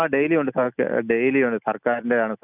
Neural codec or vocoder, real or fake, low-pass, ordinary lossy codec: none; real; 3.6 kHz; none